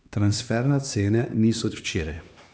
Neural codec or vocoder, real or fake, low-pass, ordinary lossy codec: codec, 16 kHz, 2 kbps, X-Codec, HuBERT features, trained on LibriSpeech; fake; none; none